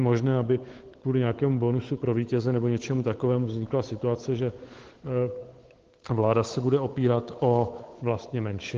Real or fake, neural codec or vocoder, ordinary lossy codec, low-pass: fake; codec, 16 kHz, 8 kbps, FunCodec, trained on Chinese and English, 25 frames a second; Opus, 16 kbps; 7.2 kHz